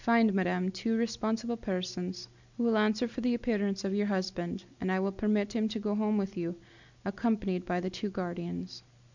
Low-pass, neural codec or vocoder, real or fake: 7.2 kHz; none; real